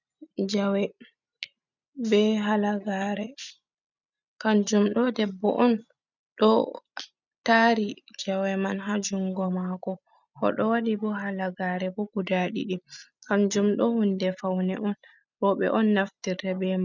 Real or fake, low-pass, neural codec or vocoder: real; 7.2 kHz; none